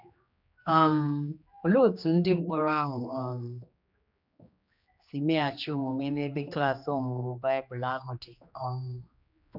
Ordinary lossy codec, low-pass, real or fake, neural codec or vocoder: none; 5.4 kHz; fake; codec, 16 kHz, 2 kbps, X-Codec, HuBERT features, trained on general audio